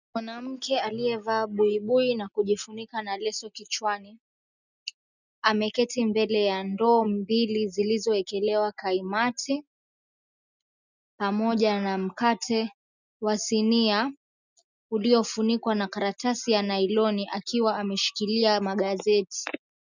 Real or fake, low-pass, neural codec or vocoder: real; 7.2 kHz; none